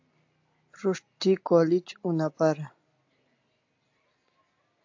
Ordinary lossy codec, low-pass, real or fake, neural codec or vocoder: AAC, 48 kbps; 7.2 kHz; real; none